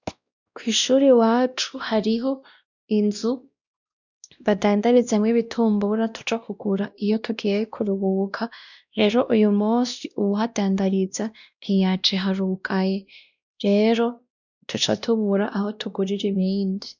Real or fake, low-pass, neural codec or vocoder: fake; 7.2 kHz; codec, 16 kHz, 1 kbps, X-Codec, WavLM features, trained on Multilingual LibriSpeech